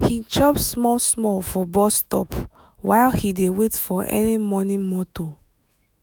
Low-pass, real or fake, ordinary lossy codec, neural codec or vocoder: none; fake; none; autoencoder, 48 kHz, 128 numbers a frame, DAC-VAE, trained on Japanese speech